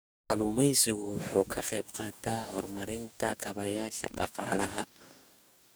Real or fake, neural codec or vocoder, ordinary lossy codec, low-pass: fake; codec, 44.1 kHz, 2.6 kbps, DAC; none; none